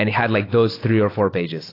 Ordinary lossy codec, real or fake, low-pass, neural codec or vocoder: AAC, 24 kbps; real; 5.4 kHz; none